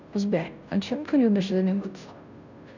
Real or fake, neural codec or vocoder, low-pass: fake; codec, 16 kHz, 0.5 kbps, FunCodec, trained on Chinese and English, 25 frames a second; 7.2 kHz